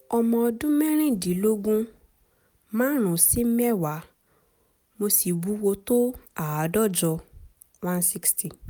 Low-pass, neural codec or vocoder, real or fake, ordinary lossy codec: none; none; real; none